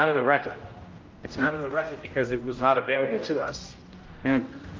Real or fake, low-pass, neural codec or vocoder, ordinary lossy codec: fake; 7.2 kHz; codec, 16 kHz, 0.5 kbps, X-Codec, HuBERT features, trained on general audio; Opus, 24 kbps